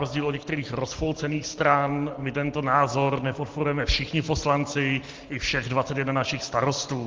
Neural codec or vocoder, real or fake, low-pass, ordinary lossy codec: none; real; 7.2 kHz; Opus, 16 kbps